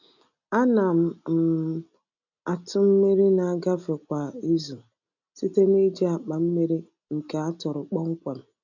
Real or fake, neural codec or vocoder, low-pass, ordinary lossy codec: real; none; 7.2 kHz; none